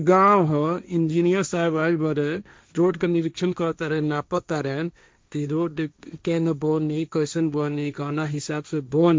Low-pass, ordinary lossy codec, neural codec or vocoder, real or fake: none; none; codec, 16 kHz, 1.1 kbps, Voila-Tokenizer; fake